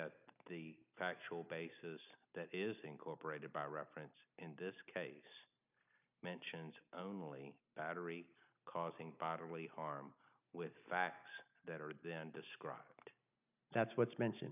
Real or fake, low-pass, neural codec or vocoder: real; 3.6 kHz; none